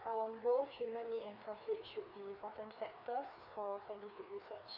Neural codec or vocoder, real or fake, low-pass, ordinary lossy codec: codec, 16 kHz, 2 kbps, FreqCodec, larger model; fake; 5.4 kHz; none